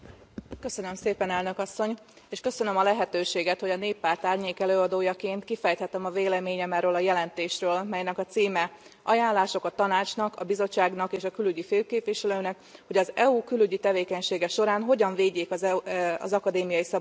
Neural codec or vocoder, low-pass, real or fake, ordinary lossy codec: none; none; real; none